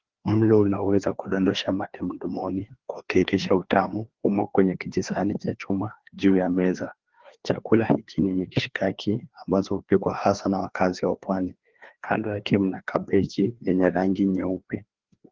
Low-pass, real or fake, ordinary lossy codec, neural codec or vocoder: 7.2 kHz; fake; Opus, 16 kbps; codec, 16 kHz, 2 kbps, FreqCodec, larger model